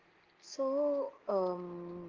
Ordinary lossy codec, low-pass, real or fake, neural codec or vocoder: Opus, 16 kbps; 7.2 kHz; real; none